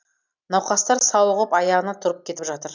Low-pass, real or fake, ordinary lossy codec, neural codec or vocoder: 7.2 kHz; real; none; none